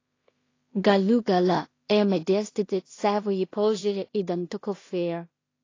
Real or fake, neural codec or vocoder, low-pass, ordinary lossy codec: fake; codec, 16 kHz in and 24 kHz out, 0.4 kbps, LongCat-Audio-Codec, two codebook decoder; 7.2 kHz; AAC, 32 kbps